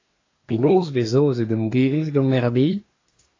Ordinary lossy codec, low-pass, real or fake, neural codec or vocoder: AAC, 32 kbps; 7.2 kHz; fake; codec, 24 kHz, 1 kbps, SNAC